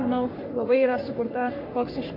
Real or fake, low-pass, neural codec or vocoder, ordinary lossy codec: fake; 5.4 kHz; codec, 44.1 kHz, 3.4 kbps, Pupu-Codec; AAC, 32 kbps